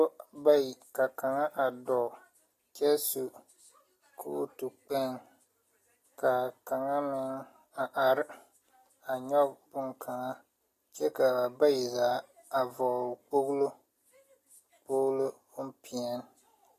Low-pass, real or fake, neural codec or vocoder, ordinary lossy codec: 14.4 kHz; real; none; AAC, 48 kbps